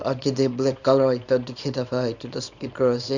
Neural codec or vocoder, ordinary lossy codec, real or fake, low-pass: codec, 24 kHz, 0.9 kbps, WavTokenizer, small release; none; fake; 7.2 kHz